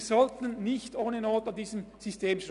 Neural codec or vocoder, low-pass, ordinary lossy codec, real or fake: none; 10.8 kHz; none; real